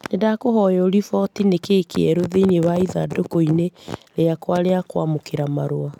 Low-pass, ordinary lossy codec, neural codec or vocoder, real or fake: 19.8 kHz; none; none; real